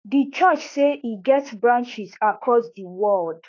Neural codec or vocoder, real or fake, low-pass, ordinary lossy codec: autoencoder, 48 kHz, 32 numbers a frame, DAC-VAE, trained on Japanese speech; fake; 7.2 kHz; AAC, 32 kbps